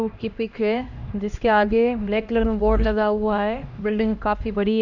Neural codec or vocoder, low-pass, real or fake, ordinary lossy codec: codec, 16 kHz, 1 kbps, X-Codec, HuBERT features, trained on LibriSpeech; 7.2 kHz; fake; none